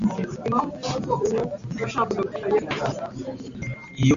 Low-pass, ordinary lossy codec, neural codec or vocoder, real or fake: 7.2 kHz; MP3, 64 kbps; none; real